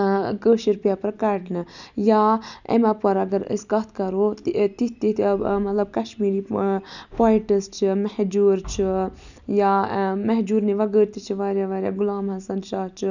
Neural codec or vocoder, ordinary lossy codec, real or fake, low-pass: none; none; real; 7.2 kHz